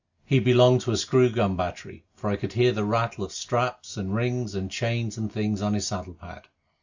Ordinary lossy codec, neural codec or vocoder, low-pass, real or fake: Opus, 64 kbps; none; 7.2 kHz; real